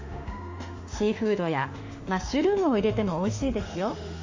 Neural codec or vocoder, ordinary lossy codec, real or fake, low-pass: autoencoder, 48 kHz, 32 numbers a frame, DAC-VAE, trained on Japanese speech; AAC, 48 kbps; fake; 7.2 kHz